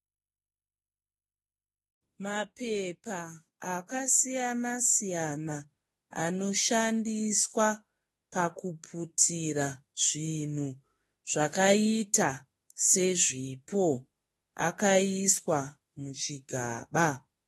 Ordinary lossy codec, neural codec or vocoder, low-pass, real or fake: AAC, 32 kbps; autoencoder, 48 kHz, 32 numbers a frame, DAC-VAE, trained on Japanese speech; 19.8 kHz; fake